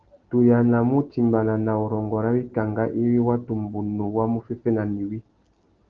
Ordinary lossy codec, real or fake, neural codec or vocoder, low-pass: Opus, 16 kbps; real; none; 7.2 kHz